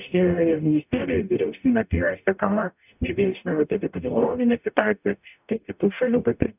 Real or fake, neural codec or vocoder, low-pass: fake; codec, 44.1 kHz, 0.9 kbps, DAC; 3.6 kHz